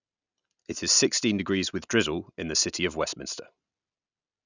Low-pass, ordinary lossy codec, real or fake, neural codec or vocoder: 7.2 kHz; none; real; none